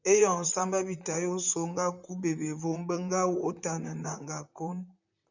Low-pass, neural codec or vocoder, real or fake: 7.2 kHz; vocoder, 44.1 kHz, 128 mel bands, Pupu-Vocoder; fake